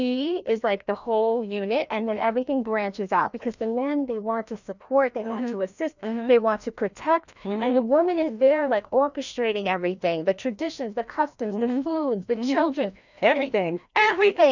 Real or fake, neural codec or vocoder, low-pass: fake; codec, 16 kHz, 1 kbps, FreqCodec, larger model; 7.2 kHz